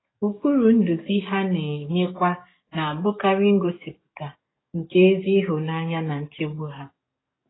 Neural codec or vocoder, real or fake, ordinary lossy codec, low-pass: codec, 44.1 kHz, 7.8 kbps, DAC; fake; AAC, 16 kbps; 7.2 kHz